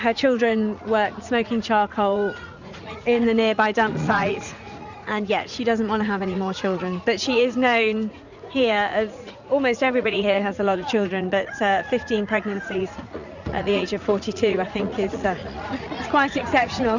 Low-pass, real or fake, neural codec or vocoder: 7.2 kHz; fake; vocoder, 44.1 kHz, 80 mel bands, Vocos